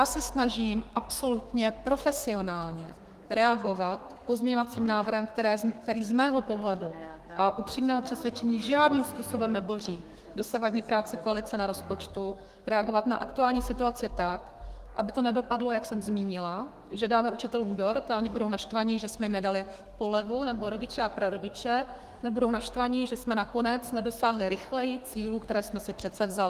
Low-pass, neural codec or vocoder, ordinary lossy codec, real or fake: 14.4 kHz; codec, 32 kHz, 1.9 kbps, SNAC; Opus, 24 kbps; fake